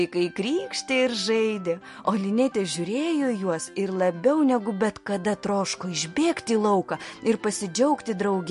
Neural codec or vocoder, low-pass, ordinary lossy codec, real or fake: none; 14.4 kHz; MP3, 48 kbps; real